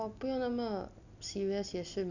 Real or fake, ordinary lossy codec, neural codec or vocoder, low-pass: real; none; none; 7.2 kHz